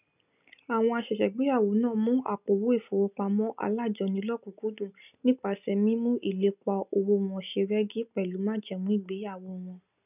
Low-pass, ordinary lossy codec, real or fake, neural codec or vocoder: 3.6 kHz; none; real; none